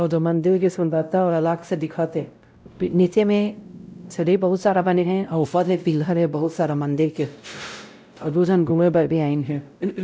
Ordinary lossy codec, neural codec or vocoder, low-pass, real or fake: none; codec, 16 kHz, 0.5 kbps, X-Codec, WavLM features, trained on Multilingual LibriSpeech; none; fake